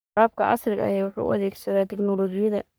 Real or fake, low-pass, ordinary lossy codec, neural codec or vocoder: fake; none; none; codec, 44.1 kHz, 3.4 kbps, Pupu-Codec